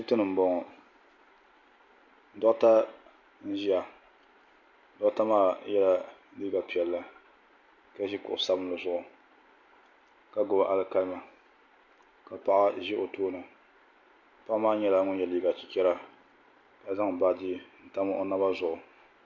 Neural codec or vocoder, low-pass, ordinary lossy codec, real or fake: none; 7.2 kHz; MP3, 48 kbps; real